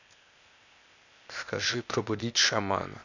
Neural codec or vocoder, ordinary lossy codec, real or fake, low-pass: codec, 16 kHz, 0.8 kbps, ZipCodec; none; fake; 7.2 kHz